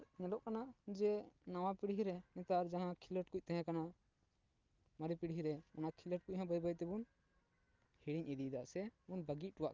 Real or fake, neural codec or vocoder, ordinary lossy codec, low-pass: real; none; Opus, 32 kbps; 7.2 kHz